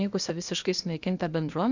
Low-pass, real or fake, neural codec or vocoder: 7.2 kHz; fake; codec, 16 kHz, 0.8 kbps, ZipCodec